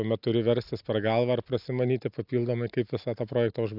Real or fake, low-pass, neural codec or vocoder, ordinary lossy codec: real; 5.4 kHz; none; AAC, 48 kbps